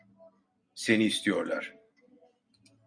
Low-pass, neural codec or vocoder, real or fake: 9.9 kHz; none; real